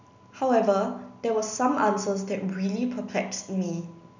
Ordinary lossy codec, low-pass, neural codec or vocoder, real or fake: none; 7.2 kHz; none; real